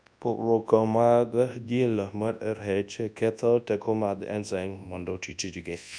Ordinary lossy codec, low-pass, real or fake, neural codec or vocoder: none; 9.9 kHz; fake; codec, 24 kHz, 0.9 kbps, WavTokenizer, large speech release